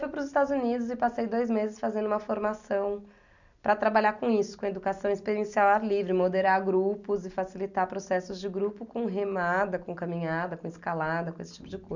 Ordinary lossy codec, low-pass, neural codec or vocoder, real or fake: none; 7.2 kHz; none; real